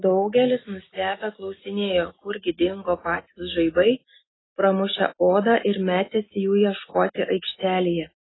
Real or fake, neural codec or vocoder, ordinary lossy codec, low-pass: real; none; AAC, 16 kbps; 7.2 kHz